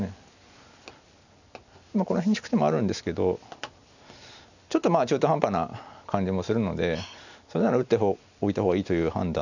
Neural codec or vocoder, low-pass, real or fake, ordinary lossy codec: none; 7.2 kHz; real; none